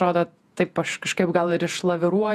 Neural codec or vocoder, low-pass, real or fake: vocoder, 48 kHz, 128 mel bands, Vocos; 14.4 kHz; fake